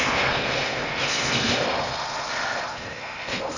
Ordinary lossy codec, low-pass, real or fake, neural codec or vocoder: none; 7.2 kHz; fake; codec, 16 kHz in and 24 kHz out, 0.8 kbps, FocalCodec, streaming, 65536 codes